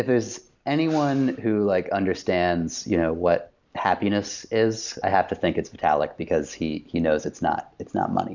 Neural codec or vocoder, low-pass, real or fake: none; 7.2 kHz; real